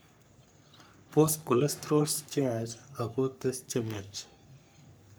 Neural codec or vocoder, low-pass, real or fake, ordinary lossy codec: codec, 44.1 kHz, 3.4 kbps, Pupu-Codec; none; fake; none